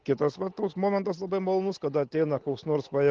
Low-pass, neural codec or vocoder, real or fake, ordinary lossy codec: 7.2 kHz; none; real; Opus, 16 kbps